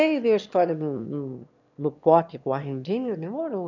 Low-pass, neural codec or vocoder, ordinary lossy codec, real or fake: 7.2 kHz; autoencoder, 22.05 kHz, a latent of 192 numbers a frame, VITS, trained on one speaker; none; fake